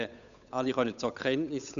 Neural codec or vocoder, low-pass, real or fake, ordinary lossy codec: codec, 16 kHz, 8 kbps, FunCodec, trained on Chinese and English, 25 frames a second; 7.2 kHz; fake; none